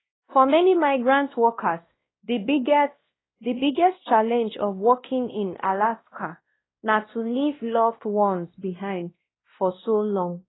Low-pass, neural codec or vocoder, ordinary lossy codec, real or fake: 7.2 kHz; codec, 16 kHz, 1 kbps, X-Codec, WavLM features, trained on Multilingual LibriSpeech; AAC, 16 kbps; fake